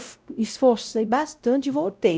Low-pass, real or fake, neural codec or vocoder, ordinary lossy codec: none; fake; codec, 16 kHz, 0.5 kbps, X-Codec, WavLM features, trained on Multilingual LibriSpeech; none